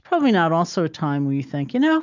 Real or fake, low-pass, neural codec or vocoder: real; 7.2 kHz; none